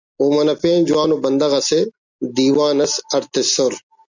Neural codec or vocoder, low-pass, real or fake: none; 7.2 kHz; real